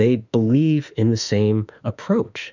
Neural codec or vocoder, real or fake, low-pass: autoencoder, 48 kHz, 32 numbers a frame, DAC-VAE, trained on Japanese speech; fake; 7.2 kHz